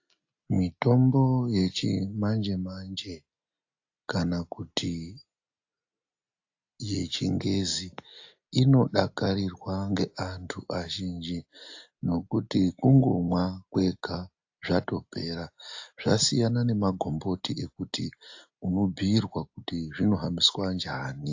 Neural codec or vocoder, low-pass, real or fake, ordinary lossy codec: none; 7.2 kHz; real; AAC, 48 kbps